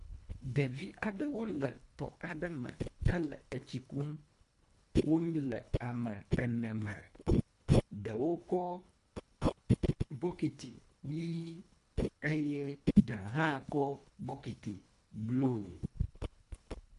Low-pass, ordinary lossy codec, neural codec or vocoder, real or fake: 10.8 kHz; MP3, 64 kbps; codec, 24 kHz, 1.5 kbps, HILCodec; fake